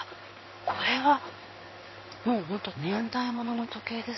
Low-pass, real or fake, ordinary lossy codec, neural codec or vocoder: 7.2 kHz; fake; MP3, 24 kbps; codec, 16 kHz in and 24 kHz out, 1 kbps, XY-Tokenizer